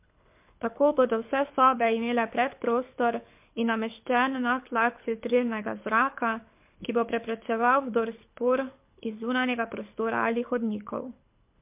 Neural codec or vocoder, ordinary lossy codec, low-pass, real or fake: codec, 24 kHz, 6 kbps, HILCodec; MP3, 32 kbps; 3.6 kHz; fake